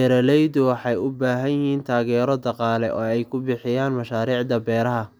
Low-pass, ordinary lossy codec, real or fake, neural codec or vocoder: none; none; real; none